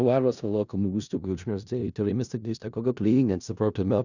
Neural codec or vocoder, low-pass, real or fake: codec, 16 kHz in and 24 kHz out, 0.4 kbps, LongCat-Audio-Codec, four codebook decoder; 7.2 kHz; fake